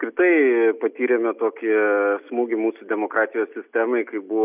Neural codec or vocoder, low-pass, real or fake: none; 3.6 kHz; real